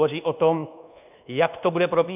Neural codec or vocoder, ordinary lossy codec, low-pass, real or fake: codec, 24 kHz, 1.2 kbps, DualCodec; AAC, 32 kbps; 3.6 kHz; fake